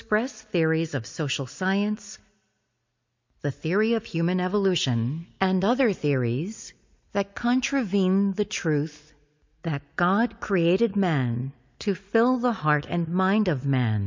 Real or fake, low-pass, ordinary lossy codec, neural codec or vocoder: real; 7.2 kHz; MP3, 48 kbps; none